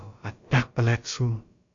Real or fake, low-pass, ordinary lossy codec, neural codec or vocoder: fake; 7.2 kHz; AAC, 48 kbps; codec, 16 kHz, about 1 kbps, DyCAST, with the encoder's durations